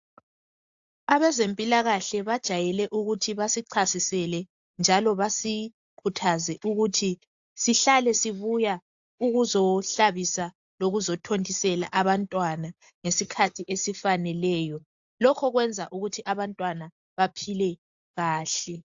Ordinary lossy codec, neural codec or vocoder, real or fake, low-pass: AAC, 64 kbps; none; real; 7.2 kHz